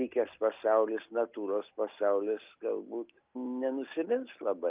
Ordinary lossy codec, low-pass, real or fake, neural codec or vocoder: Opus, 24 kbps; 3.6 kHz; real; none